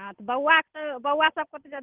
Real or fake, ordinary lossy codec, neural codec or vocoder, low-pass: real; Opus, 16 kbps; none; 3.6 kHz